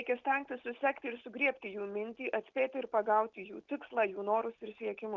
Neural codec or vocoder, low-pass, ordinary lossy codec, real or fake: none; 7.2 kHz; Opus, 24 kbps; real